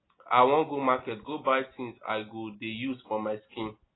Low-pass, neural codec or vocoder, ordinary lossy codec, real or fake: 7.2 kHz; none; AAC, 16 kbps; real